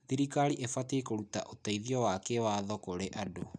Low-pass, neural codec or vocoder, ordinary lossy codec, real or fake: 9.9 kHz; none; none; real